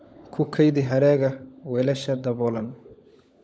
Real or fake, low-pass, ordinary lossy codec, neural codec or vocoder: fake; none; none; codec, 16 kHz, 16 kbps, FunCodec, trained on LibriTTS, 50 frames a second